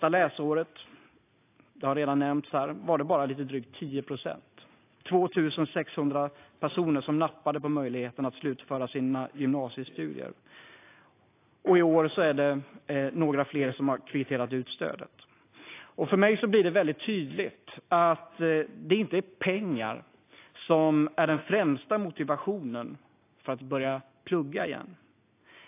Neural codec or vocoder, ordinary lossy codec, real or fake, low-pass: none; AAC, 24 kbps; real; 3.6 kHz